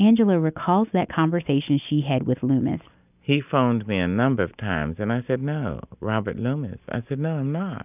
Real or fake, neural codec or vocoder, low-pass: real; none; 3.6 kHz